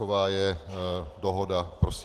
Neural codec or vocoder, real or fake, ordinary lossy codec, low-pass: none; real; Opus, 16 kbps; 14.4 kHz